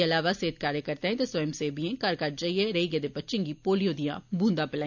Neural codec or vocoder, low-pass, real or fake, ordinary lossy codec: none; 7.2 kHz; real; none